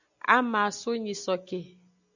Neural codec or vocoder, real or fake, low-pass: none; real; 7.2 kHz